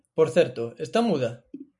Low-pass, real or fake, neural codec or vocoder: 10.8 kHz; real; none